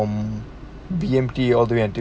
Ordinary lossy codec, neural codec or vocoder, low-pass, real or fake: none; none; none; real